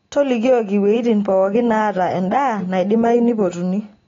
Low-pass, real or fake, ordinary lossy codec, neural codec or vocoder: 7.2 kHz; real; AAC, 32 kbps; none